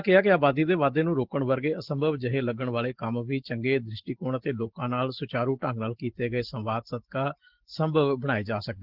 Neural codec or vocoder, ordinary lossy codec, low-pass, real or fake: none; Opus, 16 kbps; 5.4 kHz; real